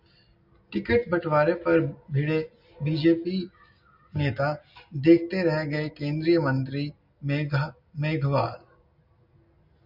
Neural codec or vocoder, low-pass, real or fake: none; 5.4 kHz; real